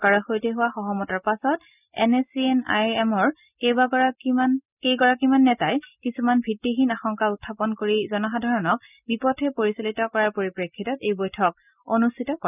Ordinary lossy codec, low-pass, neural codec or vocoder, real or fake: none; 3.6 kHz; none; real